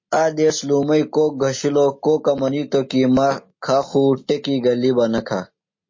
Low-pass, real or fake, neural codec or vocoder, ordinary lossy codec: 7.2 kHz; real; none; MP3, 32 kbps